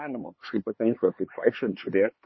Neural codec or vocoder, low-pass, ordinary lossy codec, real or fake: codec, 16 kHz, 4 kbps, X-Codec, HuBERT features, trained on LibriSpeech; 7.2 kHz; MP3, 24 kbps; fake